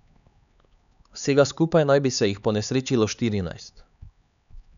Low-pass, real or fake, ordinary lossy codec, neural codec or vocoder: 7.2 kHz; fake; none; codec, 16 kHz, 4 kbps, X-Codec, HuBERT features, trained on LibriSpeech